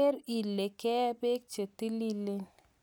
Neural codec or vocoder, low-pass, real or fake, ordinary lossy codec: none; none; real; none